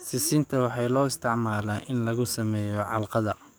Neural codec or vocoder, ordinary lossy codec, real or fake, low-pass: codec, 44.1 kHz, 7.8 kbps, DAC; none; fake; none